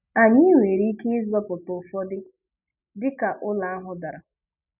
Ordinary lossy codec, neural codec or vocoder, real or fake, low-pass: none; none; real; 3.6 kHz